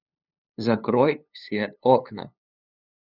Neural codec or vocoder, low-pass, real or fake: codec, 16 kHz, 2 kbps, FunCodec, trained on LibriTTS, 25 frames a second; 5.4 kHz; fake